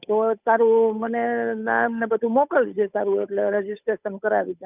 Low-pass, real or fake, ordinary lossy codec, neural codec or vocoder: 3.6 kHz; fake; none; codec, 16 kHz, 8 kbps, FunCodec, trained on Chinese and English, 25 frames a second